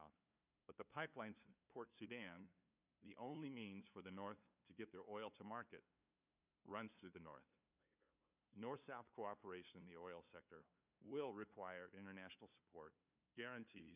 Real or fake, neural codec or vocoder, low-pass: fake; codec, 16 kHz, 2 kbps, FunCodec, trained on LibriTTS, 25 frames a second; 3.6 kHz